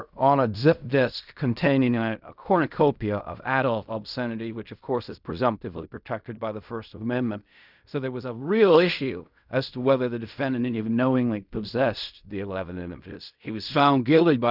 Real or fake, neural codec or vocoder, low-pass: fake; codec, 16 kHz in and 24 kHz out, 0.4 kbps, LongCat-Audio-Codec, fine tuned four codebook decoder; 5.4 kHz